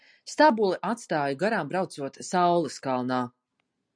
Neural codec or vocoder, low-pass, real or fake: none; 9.9 kHz; real